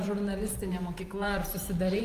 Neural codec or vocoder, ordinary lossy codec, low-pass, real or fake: vocoder, 44.1 kHz, 128 mel bands every 512 samples, BigVGAN v2; Opus, 32 kbps; 14.4 kHz; fake